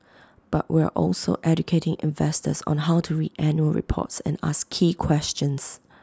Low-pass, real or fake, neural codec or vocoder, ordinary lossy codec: none; real; none; none